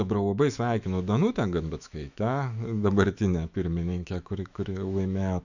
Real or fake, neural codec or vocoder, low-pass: fake; autoencoder, 48 kHz, 128 numbers a frame, DAC-VAE, trained on Japanese speech; 7.2 kHz